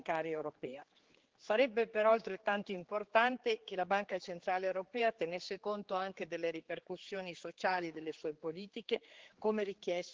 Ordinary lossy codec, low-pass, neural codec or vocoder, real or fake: Opus, 16 kbps; 7.2 kHz; codec, 16 kHz, 4 kbps, X-Codec, HuBERT features, trained on general audio; fake